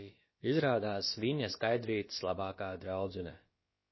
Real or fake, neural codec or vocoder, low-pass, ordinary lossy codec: fake; codec, 16 kHz, about 1 kbps, DyCAST, with the encoder's durations; 7.2 kHz; MP3, 24 kbps